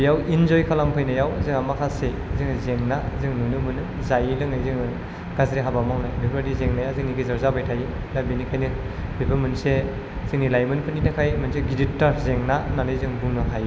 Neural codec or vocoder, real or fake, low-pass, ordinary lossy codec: none; real; none; none